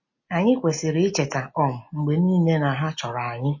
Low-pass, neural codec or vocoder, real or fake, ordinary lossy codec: 7.2 kHz; none; real; MP3, 32 kbps